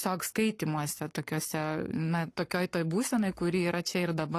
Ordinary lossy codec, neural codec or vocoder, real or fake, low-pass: AAC, 48 kbps; codec, 44.1 kHz, 7.8 kbps, Pupu-Codec; fake; 14.4 kHz